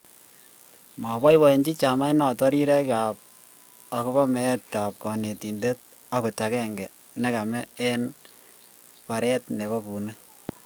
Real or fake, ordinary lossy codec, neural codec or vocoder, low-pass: fake; none; codec, 44.1 kHz, 7.8 kbps, DAC; none